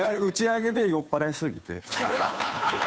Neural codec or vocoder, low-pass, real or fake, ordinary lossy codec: codec, 16 kHz, 2 kbps, FunCodec, trained on Chinese and English, 25 frames a second; none; fake; none